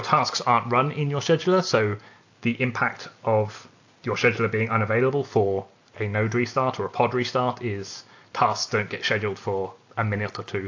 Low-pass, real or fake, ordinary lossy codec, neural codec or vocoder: 7.2 kHz; real; AAC, 48 kbps; none